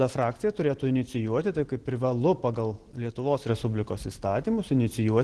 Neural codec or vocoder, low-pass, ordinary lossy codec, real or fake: none; 10.8 kHz; Opus, 16 kbps; real